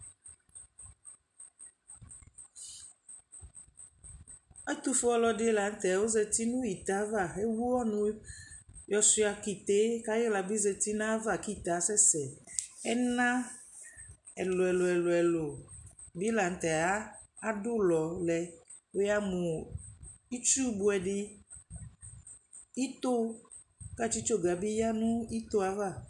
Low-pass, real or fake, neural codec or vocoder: 10.8 kHz; real; none